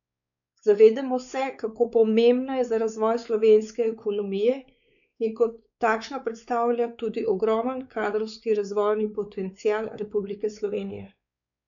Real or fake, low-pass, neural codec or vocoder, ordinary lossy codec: fake; 7.2 kHz; codec, 16 kHz, 4 kbps, X-Codec, WavLM features, trained on Multilingual LibriSpeech; none